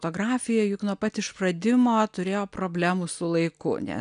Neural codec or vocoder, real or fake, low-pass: none; real; 9.9 kHz